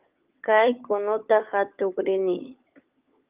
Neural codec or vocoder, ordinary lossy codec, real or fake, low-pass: codec, 16 kHz, 16 kbps, FunCodec, trained on LibriTTS, 50 frames a second; Opus, 24 kbps; fake; 3.6 kHz